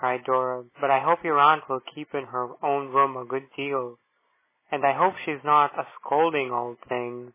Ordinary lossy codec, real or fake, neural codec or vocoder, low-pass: MP3, 16 kbps; real; none; 3.6 kHz